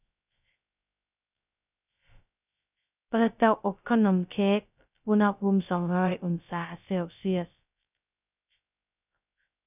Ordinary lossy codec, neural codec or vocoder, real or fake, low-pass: none; codec, 16 kHz, 0.2 kbps, FocalCodec; fake; 3.6 kHz